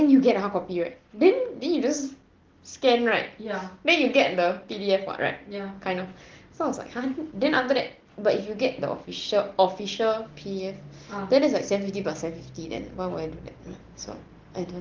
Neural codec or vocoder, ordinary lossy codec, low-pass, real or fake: none; Opus, 16 kbps; 7.2 kHz; real